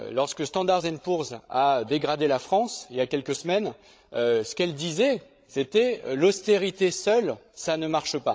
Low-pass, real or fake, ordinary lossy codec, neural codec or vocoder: none; fake; none; codec, 16 kHz, 16 kbps, FreqCodec, larger model